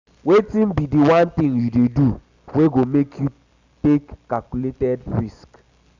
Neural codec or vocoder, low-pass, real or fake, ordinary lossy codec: none; 7.2 kHz; real; Opus, 64 kbps